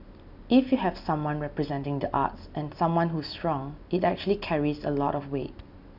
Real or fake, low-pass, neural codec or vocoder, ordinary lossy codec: real; 5.4 kHz; none; none